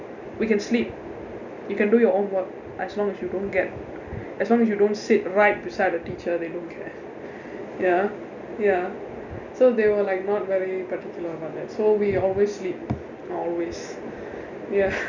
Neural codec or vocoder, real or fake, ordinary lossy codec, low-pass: none; real; none; 7.2 kHz